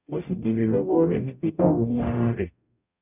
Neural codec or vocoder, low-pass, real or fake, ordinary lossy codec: codec, 44.1 kHz, 0.9 kbps, DAC; 3.6 kHz; fake; none